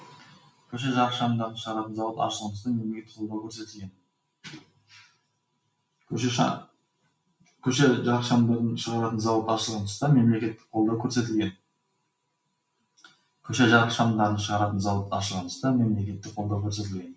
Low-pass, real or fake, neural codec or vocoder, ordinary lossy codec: none; real; none; none